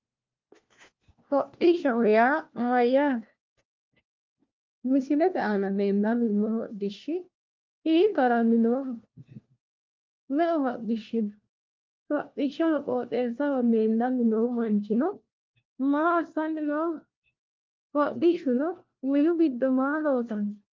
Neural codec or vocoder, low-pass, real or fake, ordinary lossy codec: codec, 16 kHz, 1 kbps, FunCodec, trained on LibriTTS, 50 frames a second; 7.2 kHz; fake; Opus, 32 kbps